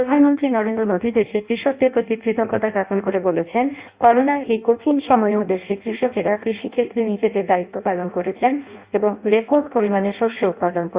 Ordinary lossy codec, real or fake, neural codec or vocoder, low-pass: Opus, 64 kbps; fake; codec, 16 kHz in and 24 kHz out, 0.6 kbps, FireRedTTS-2 codec; 3.6 kHz